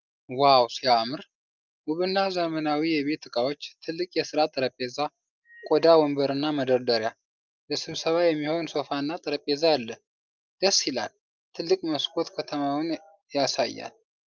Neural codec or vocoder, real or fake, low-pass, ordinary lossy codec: none; real; 7.2 kHz; Opus, 24 kbps